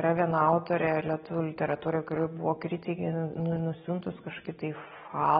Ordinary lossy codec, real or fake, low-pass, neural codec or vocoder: AAC, 16 kbps; real; 7.2 kHz; none